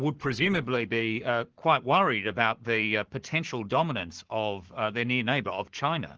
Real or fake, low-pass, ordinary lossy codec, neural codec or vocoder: fake; 7.2 kHz; Opus, 16 kbps; codec, 44.1 kHz, 7.8 kbps, Pupu-Codec